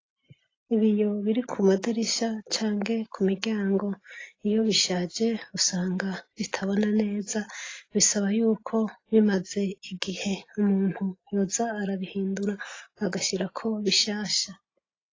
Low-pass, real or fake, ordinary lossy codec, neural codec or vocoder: 7.2 kHz; real; AAC, 32 kbps; none